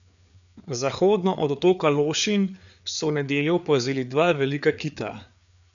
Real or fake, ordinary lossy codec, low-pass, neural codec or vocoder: fake; none; 7.2 kHz; codec, 16 kHz, 4 kbps, FreqCodec, larger model